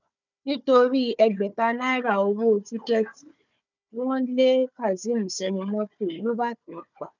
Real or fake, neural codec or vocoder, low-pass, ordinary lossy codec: fake; codec, 16 kHz, 4 kbps, FunCodec, trained on Chinese and English, 50 frames a second; 7.2 kHz; none